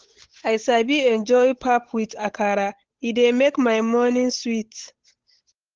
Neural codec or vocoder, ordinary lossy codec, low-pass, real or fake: codec, 16 kHz, 8 kbps, FunCodec, trained on Chinese and English, 25 frames a second; Opus, 16 kbps; 7.2 kHz; fake